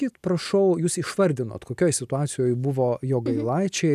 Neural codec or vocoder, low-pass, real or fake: none; 14.4 kHz; real